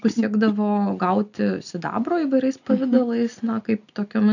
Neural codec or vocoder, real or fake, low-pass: none; real; 7.2 kHz